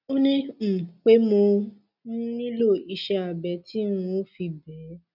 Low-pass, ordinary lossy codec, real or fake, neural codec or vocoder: 5.4 kHz; none; real; none